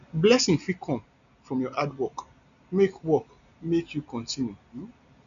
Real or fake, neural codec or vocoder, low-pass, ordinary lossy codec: real; none; 7.2 kHz; none